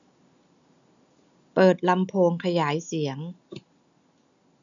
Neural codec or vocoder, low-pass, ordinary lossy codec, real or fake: none; 7.2 kHz; none; real